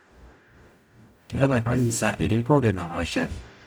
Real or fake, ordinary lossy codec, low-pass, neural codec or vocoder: fake; none; none; codec, 44.1 kHz, 0.9 kbps, DAC